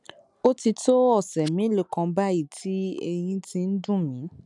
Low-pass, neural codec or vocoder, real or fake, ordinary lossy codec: 10.8 kHz; none; real; none